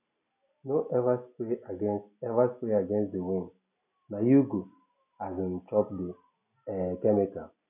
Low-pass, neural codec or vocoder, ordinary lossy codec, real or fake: 3.6 kHz; none; none; real